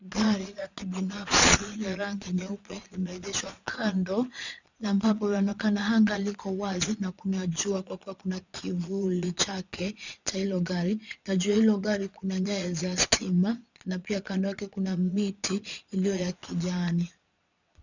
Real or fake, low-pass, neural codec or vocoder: fake; 7.2 kHz; vocoder, 22.05 kHz, 80 mel bands, Vocos